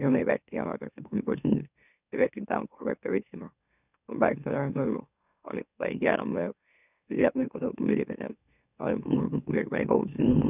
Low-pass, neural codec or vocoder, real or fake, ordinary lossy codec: 3.6 kHz; autoencoder, 44.1 kHz, a latent of 192 numbers a frame, MeloTTS; fake; none